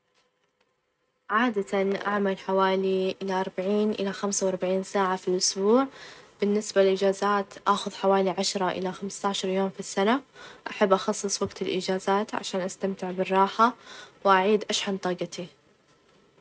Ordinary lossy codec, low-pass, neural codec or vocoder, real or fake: none; none; none; real